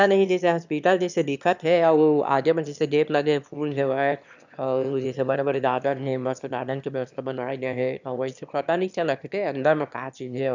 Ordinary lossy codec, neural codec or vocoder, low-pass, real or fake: none; autoencoder, 22.05 kHz, a latent of 192 numbers a frame, VITS, trained on one speaker; 7.2 kHz; fake